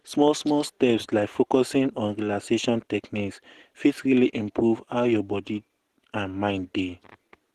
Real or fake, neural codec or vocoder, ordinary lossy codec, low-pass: real; none; Opus, 16 kbps; 14.4 kHz